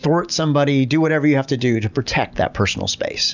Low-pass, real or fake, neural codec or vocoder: 7.2 kHz; real; none